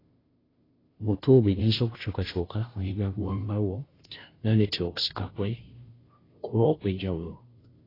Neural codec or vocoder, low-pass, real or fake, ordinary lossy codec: codec, 16 kHz, 0.5 kbps, FunCodec, trained on Chinese and English, 25 frames a second; 5.4 kHz; fake; AAC, 24 kbps